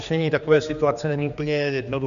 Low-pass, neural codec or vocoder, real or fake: 7.2 kHz; codec, 16 kHz, 2 kbps, X-Codec, HuBERT features, trained on general audio; fake